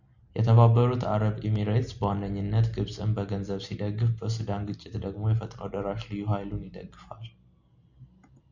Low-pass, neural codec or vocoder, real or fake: 7.2 kHz; none; real